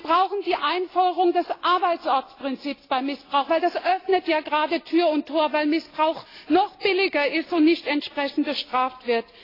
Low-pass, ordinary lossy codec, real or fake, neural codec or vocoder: 5.4 kHz; AAC, 24 kbps; real; none